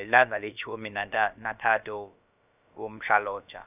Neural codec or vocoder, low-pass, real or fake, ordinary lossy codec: codec, 16 kHz, about 1 kbps, DyCAST, with the encoder's durations; 3.6 kHz; fake; none